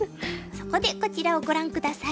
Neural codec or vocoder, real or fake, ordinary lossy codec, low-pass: none; real; none; none